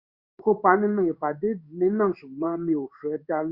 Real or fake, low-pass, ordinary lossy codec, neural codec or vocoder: fake; 5.4 kHz; Opus, 64 kbps; codec, 16 kHz in and 24 kHz out, 1 kbps, XY-Tokenizer